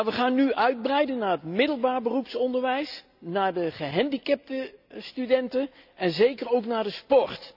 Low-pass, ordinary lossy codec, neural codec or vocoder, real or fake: 5.4 kHz; none; none; real